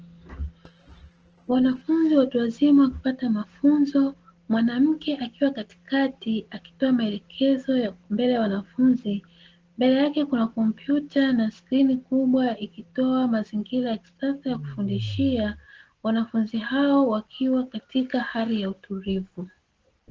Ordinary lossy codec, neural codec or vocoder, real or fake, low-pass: Opus, 16 kbps; none; real; 7.2 kHz